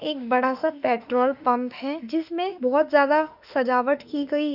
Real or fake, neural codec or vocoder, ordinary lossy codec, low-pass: fake; autoencoder, 48 kHz, 32 numbers a frame, DAC-VAE, trained on Japanese speech; none; 5.4 kHz